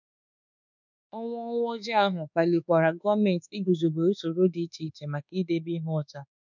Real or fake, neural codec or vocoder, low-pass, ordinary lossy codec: fake; codec, 24 kHz, 1.2 kbps, DualCodec; 7.2 kHz; none